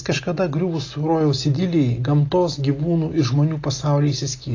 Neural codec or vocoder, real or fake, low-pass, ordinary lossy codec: none; real; 7.2 kHz; AAC, 32 kbps